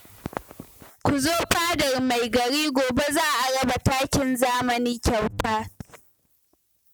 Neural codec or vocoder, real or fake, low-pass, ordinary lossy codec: none; real; none; none